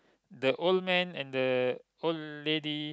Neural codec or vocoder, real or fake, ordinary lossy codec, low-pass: none; real; none; none